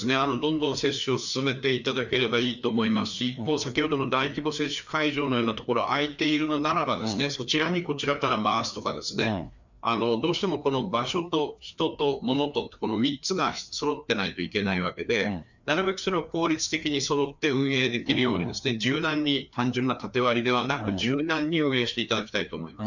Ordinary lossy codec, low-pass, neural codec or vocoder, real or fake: none; 7.2 kHz; codec, 16 kHz, 2 kbps, FreqCodec, larger model; fake